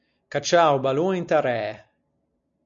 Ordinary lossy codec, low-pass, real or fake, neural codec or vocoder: MP3, 64 kbps; 7.2 kHz; real; none